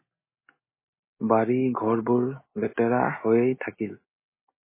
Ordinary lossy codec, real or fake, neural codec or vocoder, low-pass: MP3, 16 kbps; real; none; 3.6 kHz